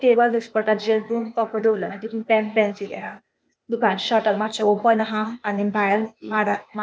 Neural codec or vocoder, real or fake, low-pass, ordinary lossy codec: codec, 16 kHz, 0.8 kbps, ZipCodec; fake; none; none